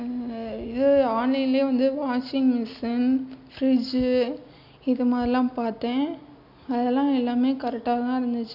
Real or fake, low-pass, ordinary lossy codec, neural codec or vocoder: real; 5.4 kHz; none; none